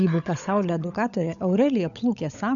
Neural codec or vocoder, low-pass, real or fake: codec, 16 kHz, 16 kbps, FunCodec, trained on LibriTTS, 50 frames a second; 7.2 kHz; fake